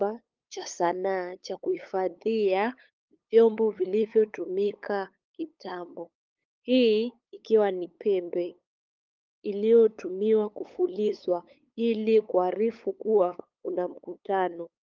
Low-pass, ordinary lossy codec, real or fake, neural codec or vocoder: 7.2 kHz; Opus, 24 kbps; fake; codec, 16 kHz, 8 kbps, FunCodec, trained on LibriTTS, 25 frames a second